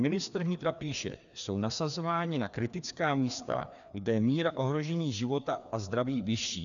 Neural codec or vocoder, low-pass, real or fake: codec, 16 kHz, 2 kbps, FreqCodec, larger model; 7.2 kHz; fake